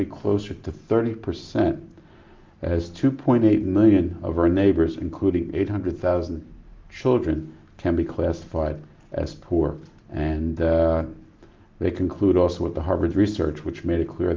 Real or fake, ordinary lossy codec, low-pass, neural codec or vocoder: real; Opus, 32 kbps; 7.2 kHz; none